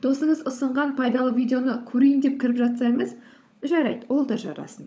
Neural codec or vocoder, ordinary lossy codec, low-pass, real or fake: codec, 16 kHz, 16 kbps, FunCodec, trained on LibriTTS, 50 frames a second; none; none; fake